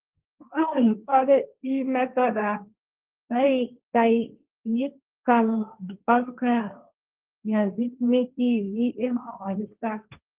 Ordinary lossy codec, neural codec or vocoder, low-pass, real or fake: Opus, 32 kbps; codec, 16 kHz, 1.1 kbps, Voila-Tokenizer; 3.6 kHz; fake